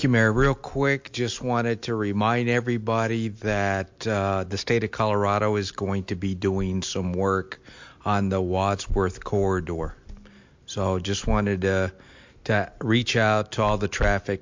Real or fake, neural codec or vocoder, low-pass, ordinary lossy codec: real; none; 7.2 kHz; MP3, 48 kbps